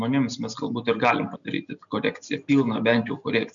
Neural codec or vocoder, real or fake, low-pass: none; real; 7.2 kHz